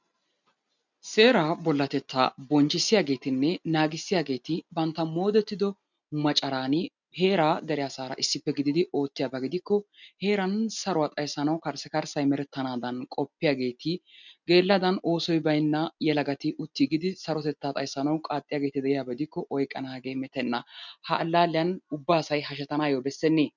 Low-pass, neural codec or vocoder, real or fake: 7.2 kHz; none; real